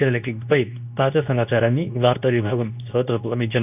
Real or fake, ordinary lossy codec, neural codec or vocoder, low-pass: fake; none; codec, 24 kHz, 0.9 kbps, WavTokenizer, medium speech release version 2; 3.6 kHz